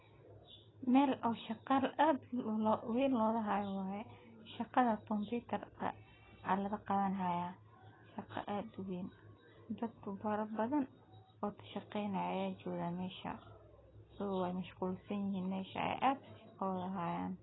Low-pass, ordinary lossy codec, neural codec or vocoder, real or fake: 7.2 kHz; AAC, 16 kbps; none; real